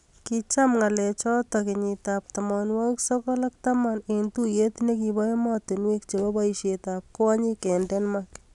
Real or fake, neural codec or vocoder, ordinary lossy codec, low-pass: real; none; none; 10.8 kHz